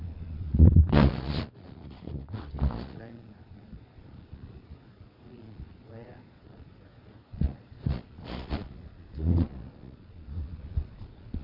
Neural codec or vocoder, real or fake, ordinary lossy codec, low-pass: vocoder, 22.05 kHz, 80 mel bands, Vocos; fake; none; 5.4 kHz